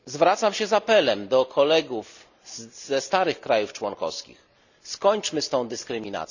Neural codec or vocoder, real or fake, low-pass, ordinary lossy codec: none; real; 7.2 kHz; none